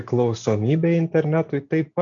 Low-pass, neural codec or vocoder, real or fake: 7.2 kHz; none; real